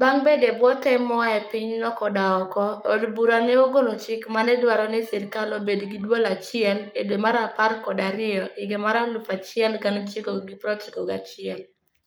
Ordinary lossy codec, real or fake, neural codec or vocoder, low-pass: none; fake; codec, 44.1 kHz, 7.8 kbps, Pupu-Codec; none